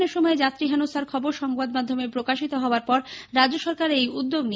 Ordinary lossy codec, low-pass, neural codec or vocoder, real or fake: none; none; none; real